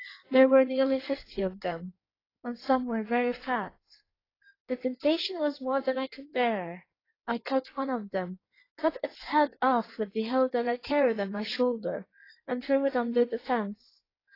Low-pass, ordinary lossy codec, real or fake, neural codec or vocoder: 5.4 kHz; AAC, 24 kbps; fake; codec, 16 kHz in and 24 kHz out, 1.1 kbps, FireRedTTS-2 codec